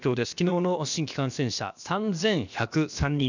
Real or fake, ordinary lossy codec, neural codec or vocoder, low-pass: fake; none; codec, 16 kHz, about 1 kbps, DyCAST, with the encoder's durations; 7.2 kHz